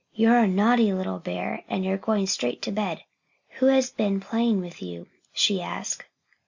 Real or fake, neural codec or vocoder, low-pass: real; none; 7.2 kHz